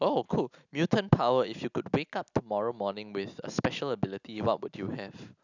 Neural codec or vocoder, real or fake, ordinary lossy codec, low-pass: none; real; none; 7.2 kHz